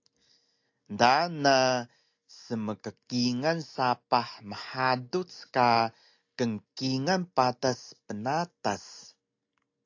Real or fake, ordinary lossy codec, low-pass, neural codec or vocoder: real; AAC, 48 kbps; 7.2 kHz; none